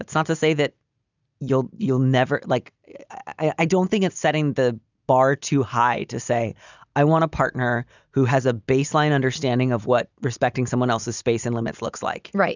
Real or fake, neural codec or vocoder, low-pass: real; none; 7.2 kHz